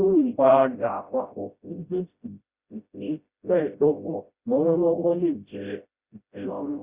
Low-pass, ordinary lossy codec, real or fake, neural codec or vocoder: 3.6 kHz; Opus, 64 kbps; fake; codec, 16 kHz, 0.5 kbps, FreqCodec, smaller model